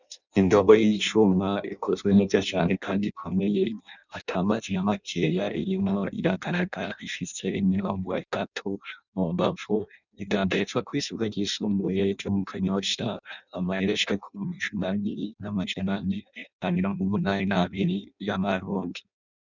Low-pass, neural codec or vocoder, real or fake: 7.2 kHz; codec, 16 kHz in and 24 kHz out, 0.6 kbps, FireRedTTS-2 codec; fake